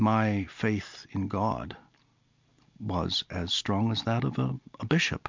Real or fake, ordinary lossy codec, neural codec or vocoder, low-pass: real; MP3, 64 kbps; none; 7.2 kHz